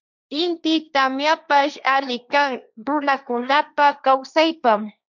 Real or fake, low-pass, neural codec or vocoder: fake; 7.2 kHz; codec, 16 kHz, 1.1 kbps, Voila-Tokenizer